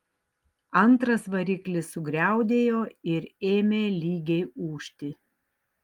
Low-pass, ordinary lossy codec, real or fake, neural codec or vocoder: 19.8 kHz; Opus, 24 kbps; real; none